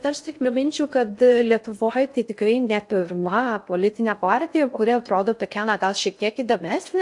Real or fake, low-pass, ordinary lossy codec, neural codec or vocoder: fake; 10.8 kHz; AAC, 64 kbps; codec, 16 kHz in and 24 kHz out, 0.6 kbps, FocalCodec, streaming, 2048 codes